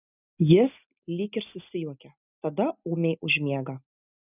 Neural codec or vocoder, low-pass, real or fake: none; 3.6 kHz; real